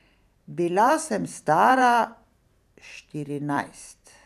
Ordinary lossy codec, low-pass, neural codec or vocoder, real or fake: none; 14.4 kHz; vocoder, 44.1 kHz, 128 mel bands every 512 samples, BigVGAN v2; fake